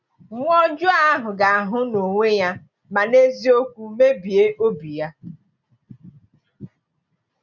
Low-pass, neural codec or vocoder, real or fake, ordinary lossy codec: 7.2 kHz; none; real; none